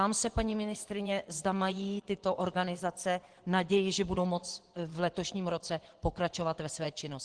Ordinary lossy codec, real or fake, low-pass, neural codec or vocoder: Opus, 16 kbps; fake; 9.9 kHz; vocoder, 24 kHz, 100 mel bands, Vocos